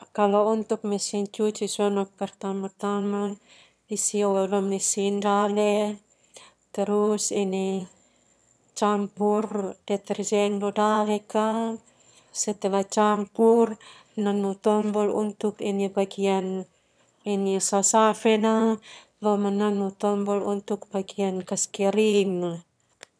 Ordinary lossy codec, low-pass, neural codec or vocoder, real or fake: none; none; autoencoder, 22.05 kHz, a latent of 192 numbers a frame, VITS, trained on one speaker; fake